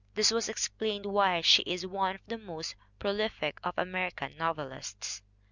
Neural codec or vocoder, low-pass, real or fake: none; 7.2 kHz; real